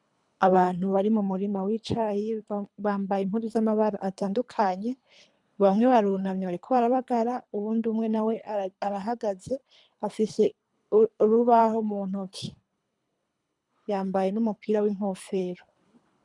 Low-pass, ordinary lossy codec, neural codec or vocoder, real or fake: 10.8 kHz; MP3, 96 kbps; codec, 24 kHz, 3 kbps, HILCodec; fake